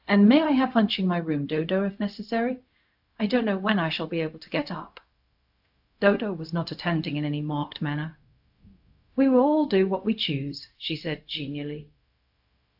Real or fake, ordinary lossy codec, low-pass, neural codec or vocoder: fake; AAC, 48 kbps; 5.4 kHz; codec, 16 kHz, 0.4 kbps, LongCat-Audio-Codec